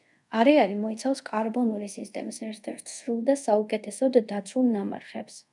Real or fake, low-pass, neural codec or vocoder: fake; 10.8 kHz; codec, 24 kHz, 0.5 kbps, DualCodec